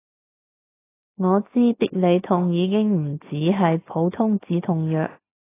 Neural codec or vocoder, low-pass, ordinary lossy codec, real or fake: none; 3.6 kHz; AAC, 24 kbps; real